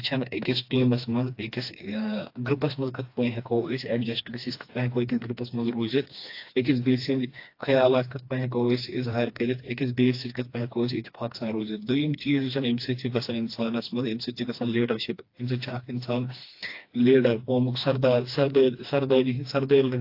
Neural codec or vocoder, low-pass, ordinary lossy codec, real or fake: codec, 16 kHz, 2 kbps, FreqCodec, smaller model; 5.4 kHz; AAC, 32 kbps; fake